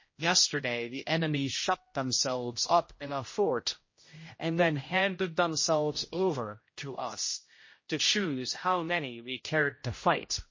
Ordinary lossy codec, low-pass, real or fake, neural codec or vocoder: MP3, 32 kbps; 7.2 kHz; fake; codec, 16 kHz, 0.5 kbps, X-Codec, HuBERT features, trained on general audio